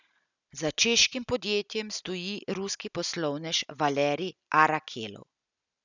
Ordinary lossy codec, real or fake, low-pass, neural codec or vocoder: none; real; 7.2 kHz; none